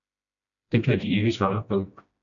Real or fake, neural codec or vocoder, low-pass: fake; codec, 16 kHz, 1 kbps, FreqCodec, smaller model; 7.2 kHz